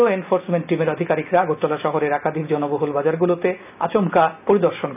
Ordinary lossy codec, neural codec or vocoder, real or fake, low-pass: none; none; real; 3.6 kHz